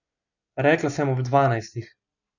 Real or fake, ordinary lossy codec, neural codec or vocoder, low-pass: real; none; none; 7.2 kHz